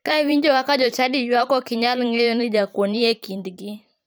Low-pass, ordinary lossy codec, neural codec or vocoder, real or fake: none; none; vocoder, 44.1 kHz, 128 mel bands every 512 samples, BigVGAN v2; fake